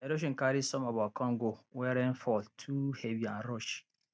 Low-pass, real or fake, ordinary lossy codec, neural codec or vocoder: none; real; none; none